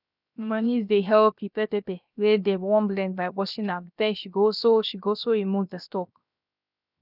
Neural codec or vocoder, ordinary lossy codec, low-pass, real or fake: codec, 16 kHz, 0.7 kbps, FocalCodec; none; 5.4 kHz; fake